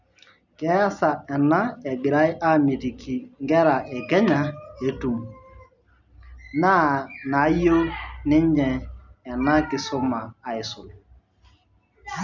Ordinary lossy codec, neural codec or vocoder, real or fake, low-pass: none; none; real; 7.2 kHz